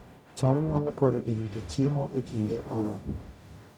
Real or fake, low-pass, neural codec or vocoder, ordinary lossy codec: fake; 19.8 kHz; codec, 44.1 kHz, 0.9 kbps, DAC; none